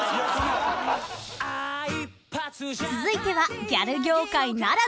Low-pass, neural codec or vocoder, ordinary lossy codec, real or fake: none; none; none; real